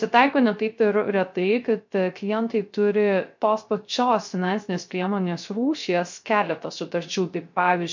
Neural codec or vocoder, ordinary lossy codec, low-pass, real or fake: codec, 16 kHz, 0.3 kbps, FocalCodec; MP3, 48 kbps; 7.2 kHz; fake